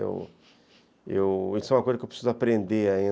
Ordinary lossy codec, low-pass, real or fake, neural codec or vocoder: none; none; real; none